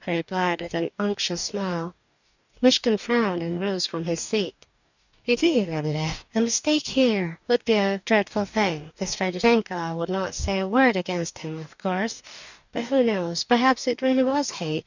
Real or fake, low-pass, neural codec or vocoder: fake; 7.2 kHz; codec, 44.1 kHz, 2.6 kbps, DAC